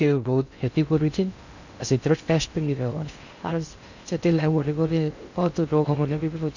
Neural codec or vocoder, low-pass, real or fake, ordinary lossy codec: codec, 16 kHz in and 24 kHz out, 0.6 kbps, FocalCodec, streaming, 2048 codes; 7.2 kHz; fake; none